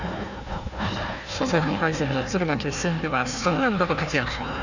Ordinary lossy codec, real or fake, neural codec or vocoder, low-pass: none; fake; codec, 16 kHz, 1 kbps, FunCodec, trained on Chinese and English, 50 frames a second; 7.2 kHz